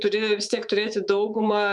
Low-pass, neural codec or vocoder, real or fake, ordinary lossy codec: 10.8 kHz; codec, 24 kHz, 3.1 kbps, DualCodec; fake; MP3, 96 kbps